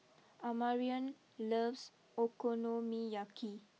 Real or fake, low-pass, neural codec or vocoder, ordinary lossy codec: real; none; none; none